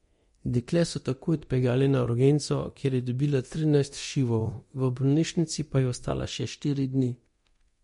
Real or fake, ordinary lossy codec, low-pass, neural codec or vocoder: fake; MP3, 48 kbps; 10.8 kHz; codec, 24 kHz, 0.9 kbps, DualCodec